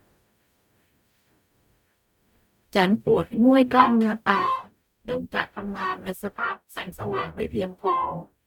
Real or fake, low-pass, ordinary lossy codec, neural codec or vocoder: fake; 19.8 kHz; none; codec, 44.1 kHz, 0.9 kbps, DAC